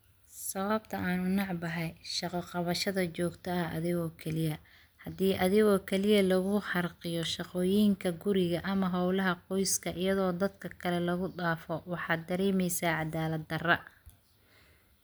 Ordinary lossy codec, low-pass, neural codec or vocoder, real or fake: none; none; none; real